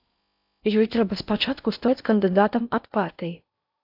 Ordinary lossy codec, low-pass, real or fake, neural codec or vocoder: AAC, 48 kbps; 5.4 kHz; fake; codec, 16 kHz in and 24 kHz out, 0.6 kbps, FocalCodec, streaming, 4096 codes